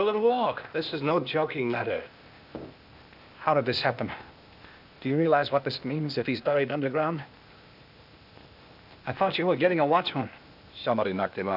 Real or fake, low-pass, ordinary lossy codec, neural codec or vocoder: fake; 5.4 kHz; AAC, 48 kbps; codec, 16 kHz, 0.8 kbps, ZipCodec